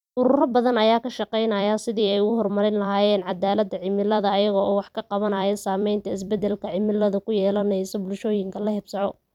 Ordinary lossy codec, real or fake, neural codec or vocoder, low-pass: none; fake; vocoder, 44.1 kHz, 128 mel bands every 256 samples, BigVGAN v2; 19.8 kHz